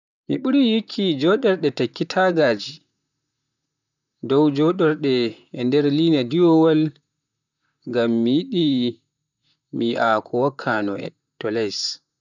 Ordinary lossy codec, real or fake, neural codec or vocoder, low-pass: none; fake; vocoder, 44.1 kHz, 128 mel bands, Pupu-Vocoder; 7.2 kHz